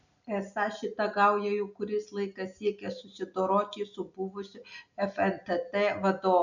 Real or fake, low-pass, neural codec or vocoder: real; 7.2 kHz; none